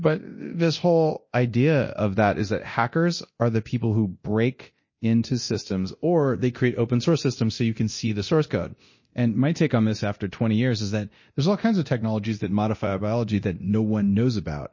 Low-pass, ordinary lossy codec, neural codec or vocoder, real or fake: 7.2 kHz; MP3, 32 kbps; codec, 24 kHz, 0.9 kbps, DualCodec; fake